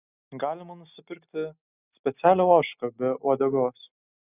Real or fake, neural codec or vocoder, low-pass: real; none; 3.6 kHz